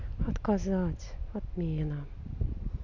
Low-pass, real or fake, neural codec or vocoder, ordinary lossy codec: 7.2 kHz; real; none; none